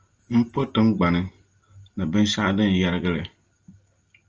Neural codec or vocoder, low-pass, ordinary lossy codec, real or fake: none; 7.2 kHz; Opus, 24 kbps; real